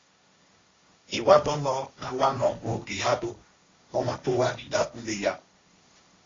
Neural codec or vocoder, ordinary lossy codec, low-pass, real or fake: codec, 16 kHz, 1.1 kbps, Voila-Tokenizer; AAC, 32 kbps; 7.2 kHz; fake